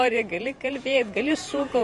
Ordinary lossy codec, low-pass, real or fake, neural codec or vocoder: MP3, 48 kbps; 14.4 kHz; fake; vocoder, 48 kHz, 128 mel bands, Vocos